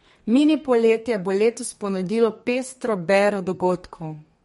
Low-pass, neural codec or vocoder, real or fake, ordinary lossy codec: 14.4 kHz; codec, 32 kHz, 1.9 kbps, SNAC; fake; MP3, 48 kbps